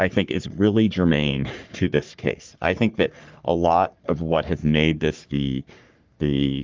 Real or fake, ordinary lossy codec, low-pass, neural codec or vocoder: fake; Opus, 24 kbps; 7.2 kHz; codec, 44.1 kHz, 3.4 kbps, Pupu-Codec